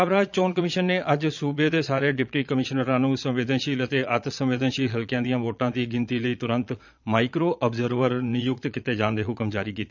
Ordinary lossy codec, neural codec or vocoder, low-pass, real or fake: none; vocoder, 22.05 kHz, 80 mel bands, Vocos; 7.2 kHz; fake